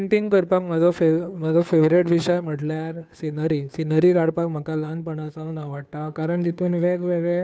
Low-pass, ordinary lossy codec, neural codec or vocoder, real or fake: none; none; codec, 16 kHz, 2 kbps, FunCodec, trained on Chinese and English, 25 frames a second; fake